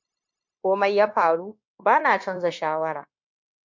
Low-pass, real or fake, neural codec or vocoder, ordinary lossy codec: 7.2 kHz; fake; codec, 16 kHz, 0.9 kbps, LongCat-Audio-Codec; MP3, 48 kbps